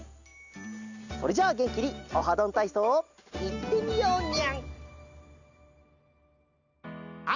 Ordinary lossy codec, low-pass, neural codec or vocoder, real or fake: none; 7.2 kHz; none; real